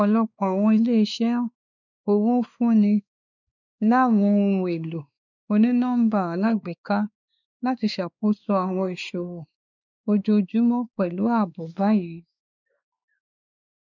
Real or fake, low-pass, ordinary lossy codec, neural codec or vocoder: fake; 7.2 kHz; none; codec, 16 kHz, 2 kbps, X-Codec, WavLM features, trained on Multilingual LibriSpeech